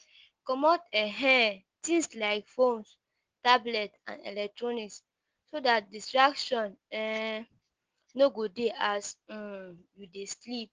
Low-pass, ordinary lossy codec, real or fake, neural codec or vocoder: 7.2 kHz; Opus, 16 kbps; real; none